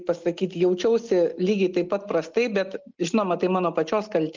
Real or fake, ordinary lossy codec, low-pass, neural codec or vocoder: real; Opus, 24 kbps; 7.2 kHz; none